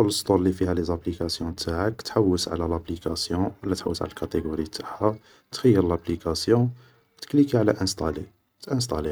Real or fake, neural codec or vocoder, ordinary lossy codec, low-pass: real; none; none; none